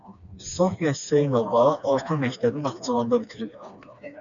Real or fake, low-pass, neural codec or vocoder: fake; 7.2 kHz; codec, 16 kHz, 2 kbps, FreqCodec, smaller model